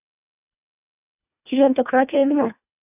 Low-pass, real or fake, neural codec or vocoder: 3.6 kHz; fake; codec, 24 kHz, 1.5 kbps, HILCodec